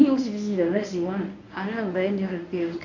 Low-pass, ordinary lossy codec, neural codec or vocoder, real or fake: 7.2 kHz; none; codec, 24 kHz, 0.9 kbps, WavTokenizer, medium speech release version 1; fake